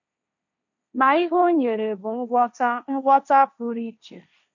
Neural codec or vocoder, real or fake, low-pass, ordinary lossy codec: codec, 16 kHz, 1.1 kbps, Voila-Tokenizer; fake; 7.2 kHz; none